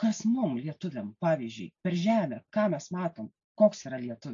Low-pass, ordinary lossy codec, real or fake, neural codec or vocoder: 7.2 kHz; AAC, 48 kbps; real; none